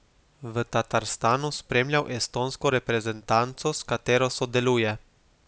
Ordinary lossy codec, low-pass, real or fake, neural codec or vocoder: none; none; real; none